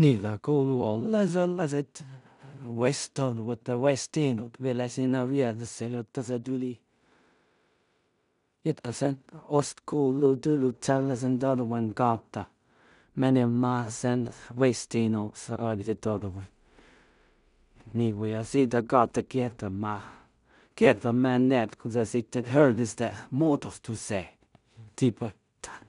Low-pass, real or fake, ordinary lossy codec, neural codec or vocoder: 10.8 kHz; fake; none; codec, 16 kHz in and 24 kHz out, 0.4 kbps, LongCat-Audio-Codec, two codebook decoder